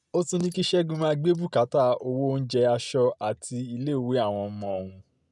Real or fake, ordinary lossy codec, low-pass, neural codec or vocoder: real; none; 10.8 kHz; none